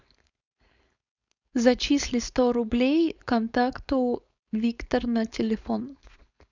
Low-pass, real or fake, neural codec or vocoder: 7.2 kHz; fake; codec, 16 kHz, 4.8 kbps, FACodec